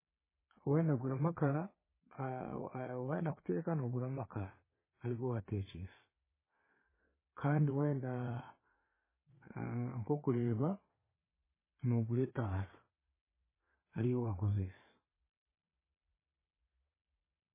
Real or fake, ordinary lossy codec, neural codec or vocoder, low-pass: fake; MP3, 16 kbps; vocoder, 22.05 kHz, 80 mel bands, Vocos; 3.6 kHz